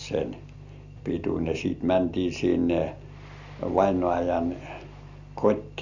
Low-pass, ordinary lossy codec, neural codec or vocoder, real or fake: 7.2 kHz; none; none; real